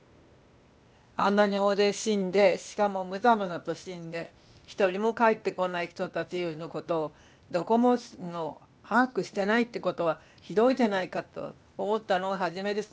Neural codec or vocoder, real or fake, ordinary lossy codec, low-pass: codec, 16 kHz, 0.8 kbps, ZipCodec; fake; none; none